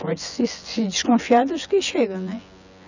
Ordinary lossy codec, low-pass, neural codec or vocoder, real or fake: none; 7.2 kHz; vocoder, 24 kHz, 100 mel bands, Vocos; fake